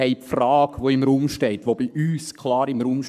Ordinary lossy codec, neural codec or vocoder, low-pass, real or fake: none; codec, 44.1 kHz, 7.8 kbps, Pupu-Codec; 14.4 kHz; fake